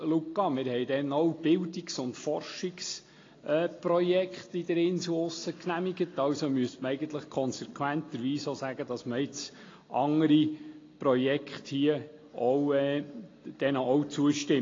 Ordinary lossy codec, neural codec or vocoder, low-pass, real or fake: AAC, 32 kbps; none; 7.2 kHz; real